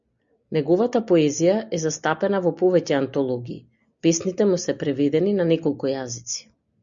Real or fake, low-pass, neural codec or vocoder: real; 7.2 kHz; none